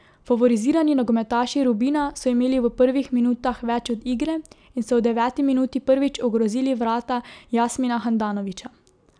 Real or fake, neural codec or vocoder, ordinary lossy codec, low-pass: real; none; none; 9.9 kHz